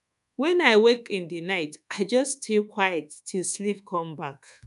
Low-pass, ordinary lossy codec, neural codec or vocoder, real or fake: 10.8 kHz; none; codec, 24 kHz, 1.2 kbps, DualCodec; fake